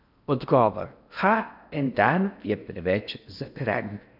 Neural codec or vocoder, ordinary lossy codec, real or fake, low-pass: codec, 16 kHz in and 24 kHz out, 0.8 kbps, FocalCodec, streaming, 65536 codes; none; fake; 5.4 kHz